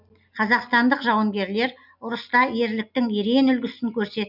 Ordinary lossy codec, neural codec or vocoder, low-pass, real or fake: none; none; 5.4 kHz; real